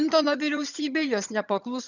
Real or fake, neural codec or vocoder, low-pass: fake; vocoder, 22.05 kHz, 80 mel bands, HiFi-GAN; 7.2 kHz